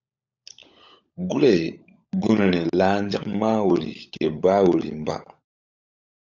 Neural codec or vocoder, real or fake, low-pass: codec, 16 kHz, 16 kbps, FunCodec, trained on LibriTTS, 50 frames a second; fake; 7.2 kHz